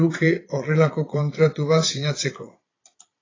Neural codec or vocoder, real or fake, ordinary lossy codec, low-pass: vocoder, 22.05 kHz, 80 mel bands, Vocos; fake; AAC, 32 kbps; 7.2 kHz